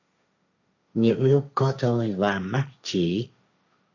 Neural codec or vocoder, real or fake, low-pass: codec, 16 kHz, 1.1 kbps, Voila-Tokenizer; fake; 7.2 kHz